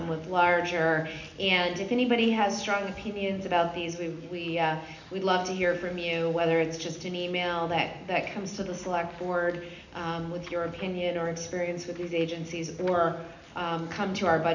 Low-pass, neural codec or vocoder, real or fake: 7.2 kHz; none; real